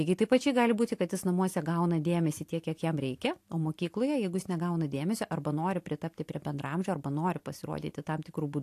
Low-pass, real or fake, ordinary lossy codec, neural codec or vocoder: 14.4 kHz; real; AAC, 64 kbps; none